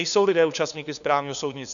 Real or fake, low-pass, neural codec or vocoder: fake; 7.2 kHz; codec, 16 kHz, 2 kbps, FunCodec, trained on LibriTTS, 25 frames a second